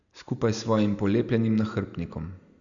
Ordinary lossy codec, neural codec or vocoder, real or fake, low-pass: none; none; real; 7.2 kHz